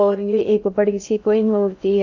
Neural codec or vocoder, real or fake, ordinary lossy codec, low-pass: codec, 16 kHz in and 24 kHz out, 0.6 kbps, FocalCodec, streaming, 2048 codes; fake; none; 7.2 kHz